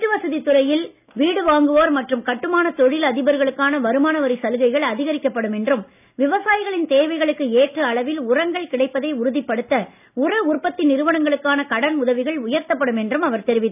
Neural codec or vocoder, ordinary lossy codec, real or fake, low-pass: none; none; real; 3.6 kHz